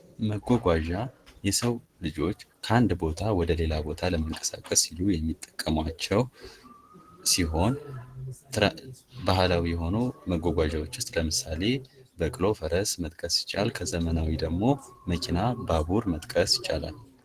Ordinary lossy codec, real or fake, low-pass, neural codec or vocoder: Opus, 16 kbps; real; 14.4 kHz; none